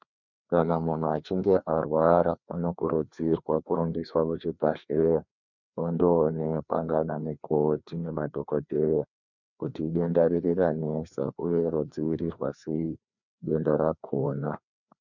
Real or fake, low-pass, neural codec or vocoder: fake; 7.2 kHz; codec, 16 kHz, 2 kbps, FreqCodec, larger model